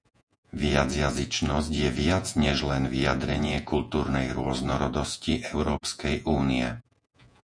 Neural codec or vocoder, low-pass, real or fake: vocoder, 48 kHz, 128 mel bands, Vocos; 9.9 kHz; fake